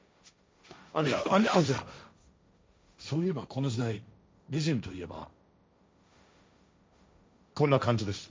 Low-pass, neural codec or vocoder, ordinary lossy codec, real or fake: none; codec, 16 kHz, 1.1 kbps, Voila-Tokenizer; none; fake